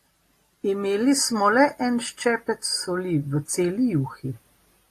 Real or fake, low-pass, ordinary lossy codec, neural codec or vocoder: real; 14.4 kHz; AAC, 48 kbps; none